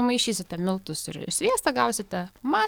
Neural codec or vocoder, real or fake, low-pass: codec, 44.1 kHz, 7.8 kbps, DAC; fake; 19.8 kHz